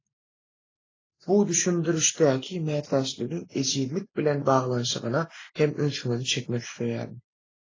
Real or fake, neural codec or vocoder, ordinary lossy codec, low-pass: real; none; AAC, 32 kbps; 7.2 kHz